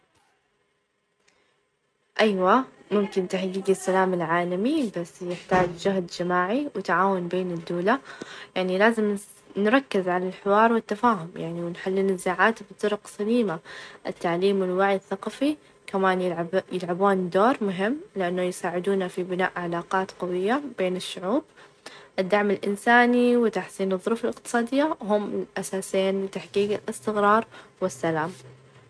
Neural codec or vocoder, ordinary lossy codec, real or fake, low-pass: none; none; real; none